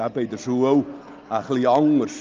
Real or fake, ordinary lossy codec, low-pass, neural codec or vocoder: real; Opus, 24 kbps; 7.2 kHz; none